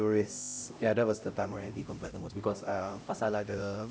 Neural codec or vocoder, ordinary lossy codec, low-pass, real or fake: codec, 16 kHz, 0.5 kbps, X-Codec, HuBERT features, trained on LibriSpeech; none; none; fake